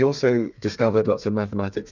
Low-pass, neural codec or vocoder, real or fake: 7.2 kHz; codec, 32 kHz, 1.9 kbps, SNAC; fake